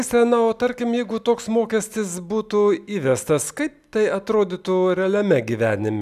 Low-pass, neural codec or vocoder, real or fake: 14.4 kHz; none; real